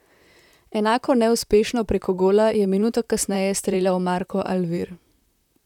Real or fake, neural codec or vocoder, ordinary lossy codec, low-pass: fake; vocoder, 44.1 kHz, 128 mel bands, Pupu-Vocoder; none; 19.8 kHz